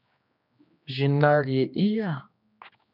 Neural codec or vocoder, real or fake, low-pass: codec, 16 kHz, 2 kbps, X-Codec, HuBERT features, trained on general audio; fake; 5.4 kHz